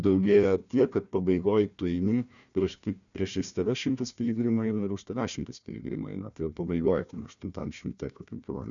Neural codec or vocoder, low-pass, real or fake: codec, 16 kHz, 1 kbps, FunCodec, trained on Chinese and English, 50 frames a second; 7.2 kHz; fake